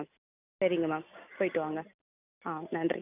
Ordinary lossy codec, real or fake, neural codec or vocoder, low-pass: none; real; none; 3.6 kHz